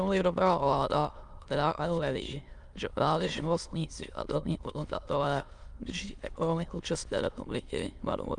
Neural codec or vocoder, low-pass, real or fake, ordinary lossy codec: autoencoder, 22.05 kHz, a latent of 192 numbers a frame, VITS, trained on many speakers; 9.9 kHz; fake; Opus, 32 kbps